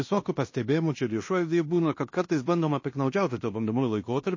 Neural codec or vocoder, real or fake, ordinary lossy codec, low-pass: codec, 16 kHz in and 24 kHz out, 0.9 kbps, LongCat-Audio-Codec, fine tuned four codebook decoder; fake; MP3, 32 kbps; 7.2 kHz